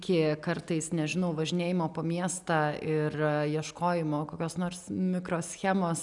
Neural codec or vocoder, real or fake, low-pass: none; real; 10.8 kHz